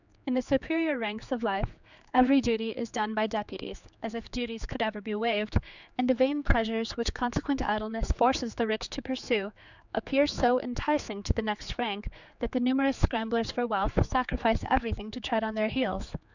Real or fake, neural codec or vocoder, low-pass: fake; codec, 16 kHz, 4 kbps, X-Codec, HuBERT features, trained on general audio; 7.2 kHz